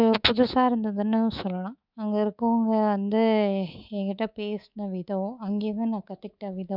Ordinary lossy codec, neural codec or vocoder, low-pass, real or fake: none; codec, 24 kHz, 3.1 kbps, DualCodec; 5.4 kHz; fake